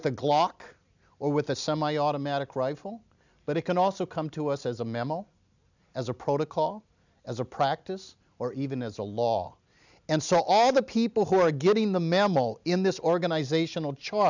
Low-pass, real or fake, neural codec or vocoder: 7.2 kHz; real; none